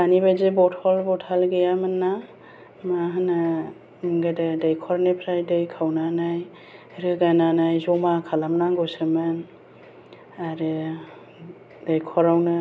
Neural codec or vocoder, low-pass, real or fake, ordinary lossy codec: none; none; real; none